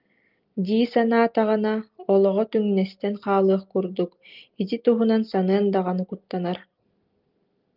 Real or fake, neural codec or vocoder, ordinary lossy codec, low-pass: real; none; Opus, 32 kbps; 5.4 kHz